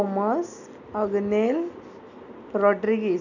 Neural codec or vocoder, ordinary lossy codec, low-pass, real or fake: none; none; 7.2 kHz; real